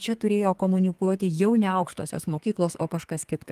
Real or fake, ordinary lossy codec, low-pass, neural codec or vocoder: fake; Opus, 24 kbps; 14.4 kHz; codec, 32 kHz, 1.9 kbps, SNAC